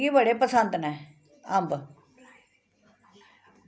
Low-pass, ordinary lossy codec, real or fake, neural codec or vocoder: none; none; real; none